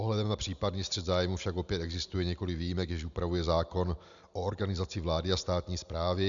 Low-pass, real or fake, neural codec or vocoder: 7.2 kHz; real; none